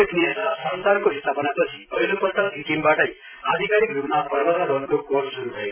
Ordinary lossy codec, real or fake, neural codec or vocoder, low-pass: AAC, 32 kbps; fake; vocoder, 44.1 kHz, 128 mel bands every 256 samples, BigVGAN v2; 3.6 kHz